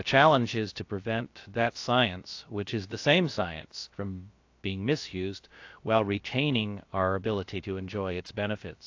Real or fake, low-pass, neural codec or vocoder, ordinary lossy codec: fake; 7.2 kHz; codec, 16 kHz, about 1 kbps, DyCAST, with the encoder's durations; AAC, 48 kbps